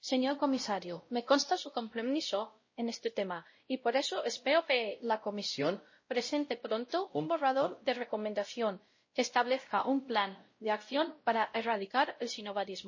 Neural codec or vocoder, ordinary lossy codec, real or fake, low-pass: codec, 16 kHz, 0.5 kbps, X-Codec, WavLM features, trained on Multilingual LibriSpeech; MP3, 32 kbps; fake; 7.2 kHz